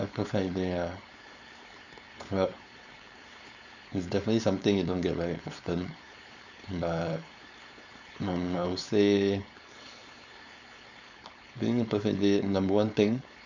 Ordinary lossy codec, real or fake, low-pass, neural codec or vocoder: none; fake; 7.2 kHz; codec, 16 kHz, 4.8 kbps, FACodec